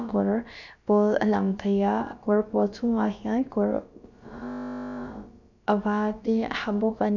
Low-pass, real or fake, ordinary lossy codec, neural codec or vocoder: 7.2 kHz; fake; none; codec, 16 kHz, about 1 kbps, DyCAST, with the encoder's durations